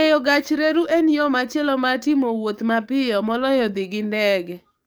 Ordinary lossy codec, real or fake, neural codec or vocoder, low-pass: none; fake; codec, 44.1 kHz, 7.8 kbps, DAC; none